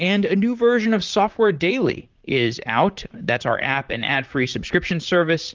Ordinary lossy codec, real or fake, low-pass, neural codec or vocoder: Opus, 24 kbps; fake; 7.2 kHz; vocoder, 44.1 kHz, 128 mel bands, Pupu-Vocoder